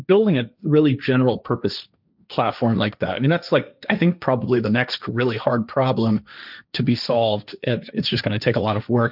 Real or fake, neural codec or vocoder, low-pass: fake; codec, 16 kHz, 1.1 kbps, Voila-Tokenizer; 5.4 kHz